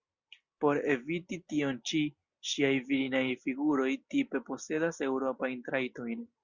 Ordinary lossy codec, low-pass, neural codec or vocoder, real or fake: Opus, 64 kbps; 7.2 kHz; none; real